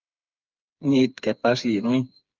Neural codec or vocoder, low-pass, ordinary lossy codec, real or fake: codec, 16 kHz, 4 kbps, FreqCodec, smaller model; 7.2 kHz; Opus, 32 kbps; fake